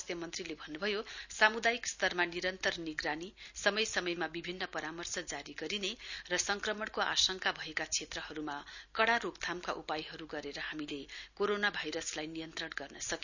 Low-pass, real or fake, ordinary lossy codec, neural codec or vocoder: 7.2 kHz; real; none; none